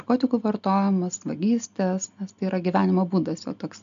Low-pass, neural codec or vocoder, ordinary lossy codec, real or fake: 7.2 kHz; none; AAC, 96 kbps; real